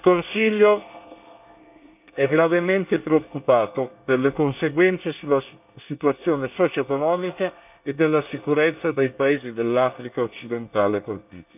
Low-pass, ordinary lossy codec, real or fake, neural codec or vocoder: 3.6 kHz; none; fake; codec, 24 kHz, 1 kbps, SNAC